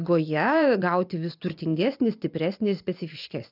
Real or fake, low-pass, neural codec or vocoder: fake; 5.4 kHz; vocoder, 24 kHz, 100 mel bands, Vocos